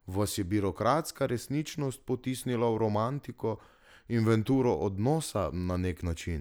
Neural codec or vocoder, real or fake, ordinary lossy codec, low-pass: none; real; none; none